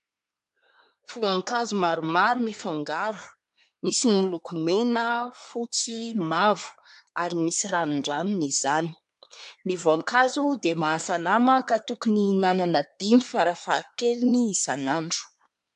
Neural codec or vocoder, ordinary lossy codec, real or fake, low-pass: codec, 24 kHz, 1 kbps, SNAC; AAC, 96 kbps; fake; 10.8 kHz